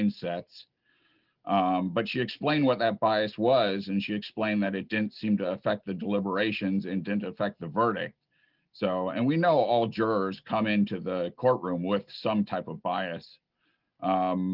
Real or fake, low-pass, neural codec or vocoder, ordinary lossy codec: real; 5.4 kHz; none; Opus, 24 kbps